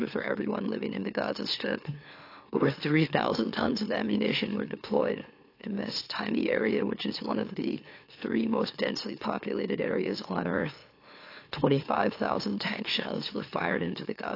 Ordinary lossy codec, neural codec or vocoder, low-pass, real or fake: AAC, 32 kbps; autoencoder, 44.1 kHz, a latent of 192 numbers a frame, MeloTTS; 5.4 kHz; fake